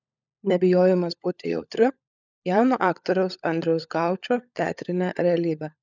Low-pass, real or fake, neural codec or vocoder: 7.2 kHz; fake; codec, 16 kHz, 16 kbps, FunCodec, trained on LibriTTS, 50 frames a second